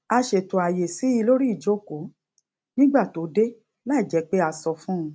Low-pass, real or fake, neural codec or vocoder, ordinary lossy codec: none; real; none; none